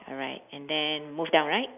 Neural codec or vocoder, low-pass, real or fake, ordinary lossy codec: none; 3.6 kHz; real; none